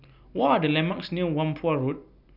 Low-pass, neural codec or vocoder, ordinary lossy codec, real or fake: 5.4 kHz; none; none; real